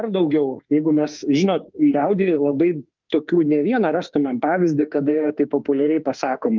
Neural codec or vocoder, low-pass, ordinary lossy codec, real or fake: autoencoder, 48 kHz, 32 numbers a frame, DAC-VAE, trained on Japanese speech; 7.2 kHz; Opus, 24 kbps; fake